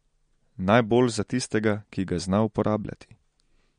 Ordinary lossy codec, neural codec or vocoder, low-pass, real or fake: MP3, 48 kbps; none; 9.9 kHz; real